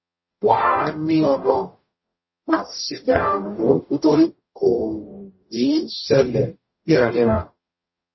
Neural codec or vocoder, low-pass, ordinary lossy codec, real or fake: codec, 44.1 kHz, 0.9 kbps, DAC; 7.2 kHz; MP3, 24 kbps; fake